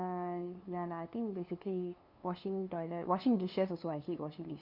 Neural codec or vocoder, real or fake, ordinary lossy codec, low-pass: codec, 16 kHz, 2 kbps, FunCodec, trained on LibriTTS, 25 frames a second; fake; Opus, 64 kbps; 5.4 kHz